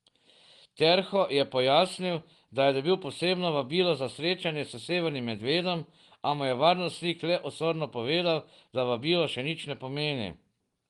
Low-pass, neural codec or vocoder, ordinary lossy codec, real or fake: 10.8 kHz; none; Opus, 24 kbps; real